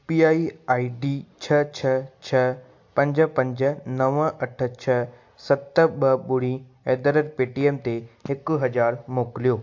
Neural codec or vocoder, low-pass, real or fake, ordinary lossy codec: none; 7.2 kHz; real; AAC, 48 kbps